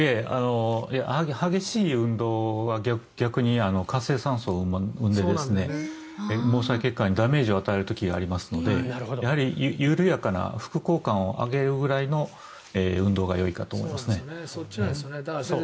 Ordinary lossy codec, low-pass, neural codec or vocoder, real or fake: none; none; none; real